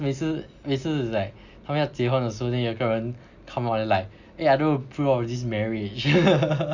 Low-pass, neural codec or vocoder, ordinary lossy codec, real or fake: 7.2 kHz; none; Opus, 64 kbps; real